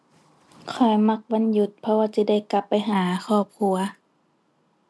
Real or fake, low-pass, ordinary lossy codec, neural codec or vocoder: real; none; none; none